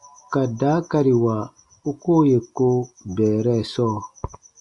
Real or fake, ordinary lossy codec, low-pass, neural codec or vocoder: real; Opus, 64 kbps; 10.8 kHz; none